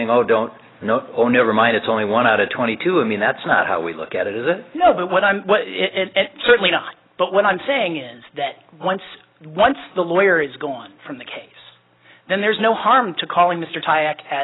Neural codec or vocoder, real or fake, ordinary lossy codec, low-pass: none; real; AAC, 16 kbps; 7.2 kHz